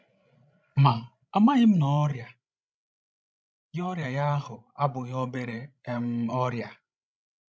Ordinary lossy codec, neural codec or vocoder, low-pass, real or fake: none; codec, 16 kHz, 16 kbps, FreqCodec, larger model; none; fake